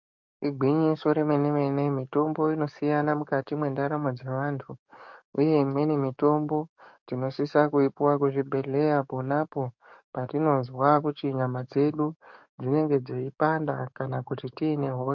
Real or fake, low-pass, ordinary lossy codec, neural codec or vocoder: fake; 7.2 kHz; MP3, 48 kbps; codec, 44.1 kHz, 7.8 kbps, DAC